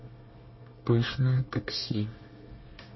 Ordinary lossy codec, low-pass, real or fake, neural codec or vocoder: MP3, 24 kbps; 7.2 kHz; fake; codec, 24 kHz, 1 kbps, SNAC